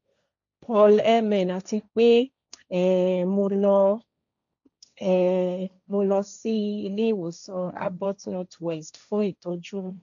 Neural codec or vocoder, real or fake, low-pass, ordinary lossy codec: codec, 16 kHz, 1.1 kbps, Voila-Tokenizer; fake; 7.2 kHz; none